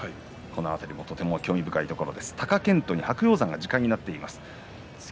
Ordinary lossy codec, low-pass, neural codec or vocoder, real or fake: none; none; none; real